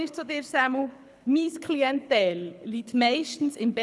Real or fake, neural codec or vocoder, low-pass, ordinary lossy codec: fake; codec, 24 kHz, 6 kbps, HILCodec; none; none